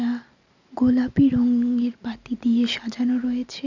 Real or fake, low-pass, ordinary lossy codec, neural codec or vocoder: real; 7.2 kHz; none; none